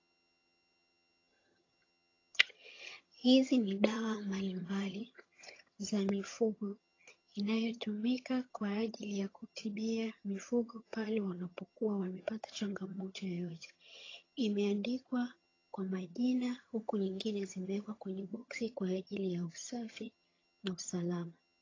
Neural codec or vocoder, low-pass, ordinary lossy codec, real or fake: vocoder, 22.05 kHz, 80 mel bands, HiFi-GAN; 7.2 kHz; AAC, 32 kbps; fake